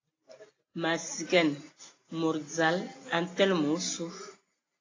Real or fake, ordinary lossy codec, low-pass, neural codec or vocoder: real; AAC, 32 kbps; 7.2 kHz; none